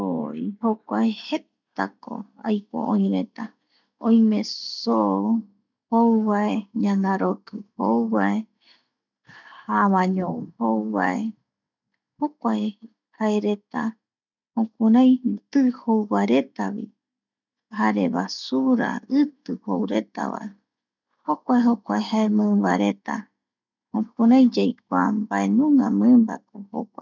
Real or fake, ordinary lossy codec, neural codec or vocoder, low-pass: real; none; none; 7.2 kHz